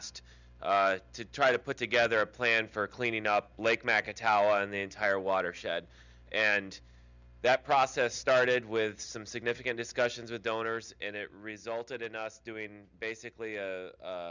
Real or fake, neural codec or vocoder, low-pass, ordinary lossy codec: real; none; 7.2 kHz; Opus, 64 kbps